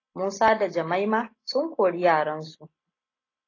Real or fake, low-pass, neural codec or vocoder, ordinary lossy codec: real; 7.2 kHz; none; AAC, 32 kbps